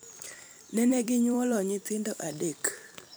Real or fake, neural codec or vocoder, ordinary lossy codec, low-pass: fake; vocoder, 44.1 kHz, 128 mel bands every 256 samples, BigVGAN v2; none; none